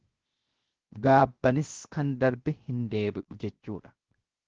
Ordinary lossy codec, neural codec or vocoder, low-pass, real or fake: Opus, 16 kbps; codec, 16 kHz, 0.7 kbps, FocalCodec; 7.2 kHz; fake